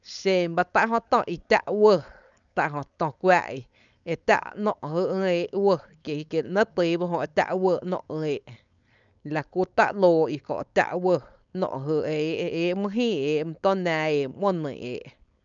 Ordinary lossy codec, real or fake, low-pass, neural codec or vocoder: none; fake; 7.2 kHz; codec, 16 kHz, 4.8 kbps, FACodec